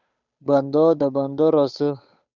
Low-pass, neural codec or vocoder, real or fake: 7.2 kHz; codec, 16 kHz, 8 kbps, FunCodec, trained on Chinese and English, 25 frames a second; fake